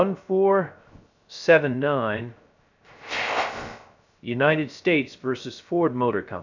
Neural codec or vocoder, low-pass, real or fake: codec, 16 kHz, 0.3 kbps, FocalCodec; 7.2 kHz; fake